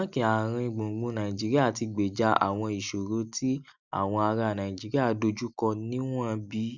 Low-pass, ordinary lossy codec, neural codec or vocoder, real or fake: 7.2 kHz; none; none; real